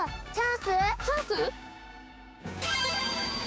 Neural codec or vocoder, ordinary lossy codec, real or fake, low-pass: codec, 16 kHz, 6 kbps, DAC; none; fake; none